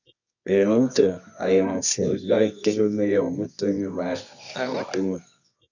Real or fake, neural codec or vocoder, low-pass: fake; codec, 24 kHz, 0.9 kbps, WavTokenizer, medium music audio release; 7.2 kHz